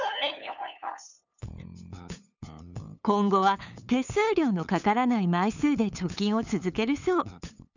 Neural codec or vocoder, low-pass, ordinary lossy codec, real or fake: codec, 16 kHz, 8 kbps, FunCodec, trained on LibriTTS, 25 frames a second; 7.2 kHz; none; fake